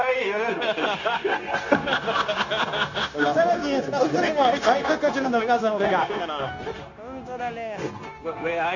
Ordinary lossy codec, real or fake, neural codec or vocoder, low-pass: none; fake; codec, 16 kHz, 0.9 kbps, LongCat-Audio-Codec; 7.2 kHz